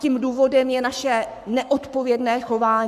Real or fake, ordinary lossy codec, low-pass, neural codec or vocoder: fake; AAC, 96 kbps; 14.4 kHz; codec, 44.1 kHz, 7.8 kbps, Pupu-Codec